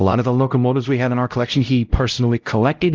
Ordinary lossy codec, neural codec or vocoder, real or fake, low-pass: Opus, 32 kbps; codec, 16 kHz, 0.5 kbps, X-Codec, HuBERT features, trained on LibriSpeech; fake; 7.2 kHz